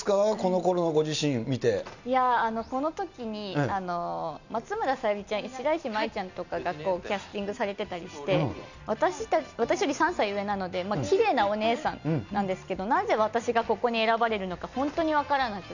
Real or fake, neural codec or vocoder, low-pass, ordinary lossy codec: real; none; 7.2 kHz; none